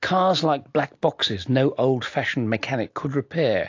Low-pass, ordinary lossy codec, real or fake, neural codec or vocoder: 7.2 kHz; AAC, 48 kbps; real; none